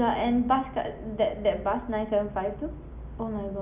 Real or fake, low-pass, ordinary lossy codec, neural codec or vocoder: real; 3.6 kHz; none; none